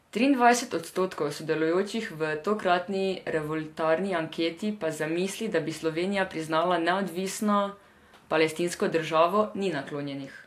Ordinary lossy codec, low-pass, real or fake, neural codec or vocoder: AAC, 64 kbps; 14.4 kHz; real; none